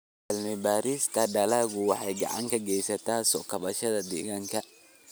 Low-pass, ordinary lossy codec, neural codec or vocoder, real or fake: none; none; none; real